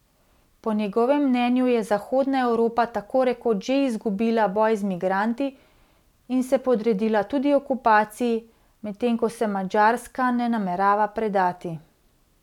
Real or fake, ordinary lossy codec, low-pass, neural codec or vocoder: real; none; 19.8 kHz; none